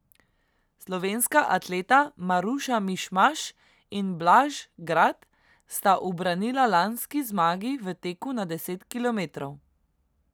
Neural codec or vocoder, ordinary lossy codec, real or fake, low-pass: none; none; real; none